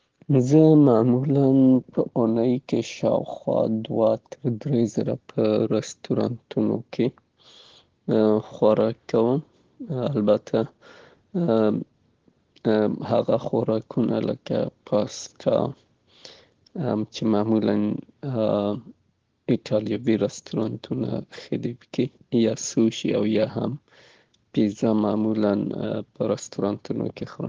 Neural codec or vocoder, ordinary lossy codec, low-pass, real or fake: none; Opus, 16 kbps; 7.2 kHz; real